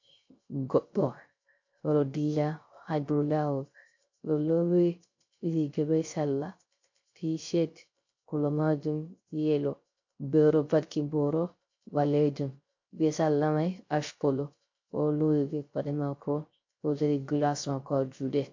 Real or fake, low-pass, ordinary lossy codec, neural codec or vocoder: fake; 7.2 kHz; MP3, 48 kbps; codec, 16 kHz, 0.3 kbps, FocalCodec